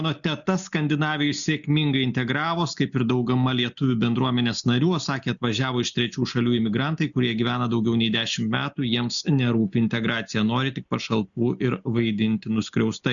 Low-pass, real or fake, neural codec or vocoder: 7.2 kHz; real; none